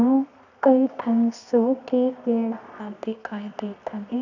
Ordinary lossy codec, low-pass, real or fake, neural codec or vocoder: none; 7.2 kHz; fake; codec, 24 kHz, 0.9 kbps, WavTokenizer, medium music audio release